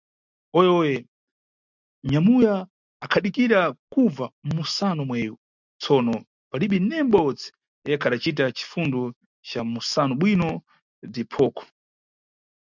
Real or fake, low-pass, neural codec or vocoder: real; 7.2 kHz; none